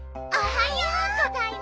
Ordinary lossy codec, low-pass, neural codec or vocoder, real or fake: none; none; none; real